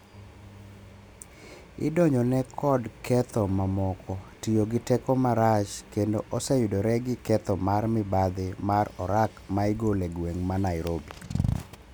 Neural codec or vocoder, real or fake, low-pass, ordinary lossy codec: none; real; none; none